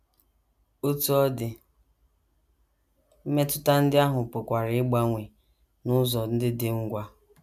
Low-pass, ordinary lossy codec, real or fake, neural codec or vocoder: 14.4 kHz; none; real; none